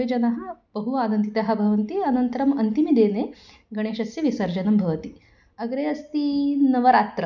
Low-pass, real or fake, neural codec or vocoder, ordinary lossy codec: 7.2 kHz; real; none; none